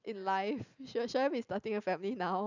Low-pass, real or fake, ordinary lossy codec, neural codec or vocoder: 7.2 kHz; real; none; none